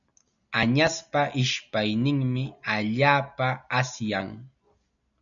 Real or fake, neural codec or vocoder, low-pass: real; none; 7.2 kHz